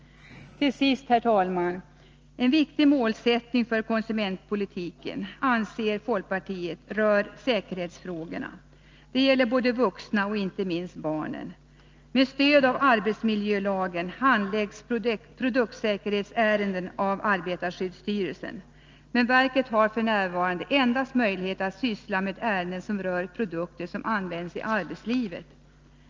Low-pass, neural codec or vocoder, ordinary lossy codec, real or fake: 7.2 kHz; none; Opus, 24 kbps; real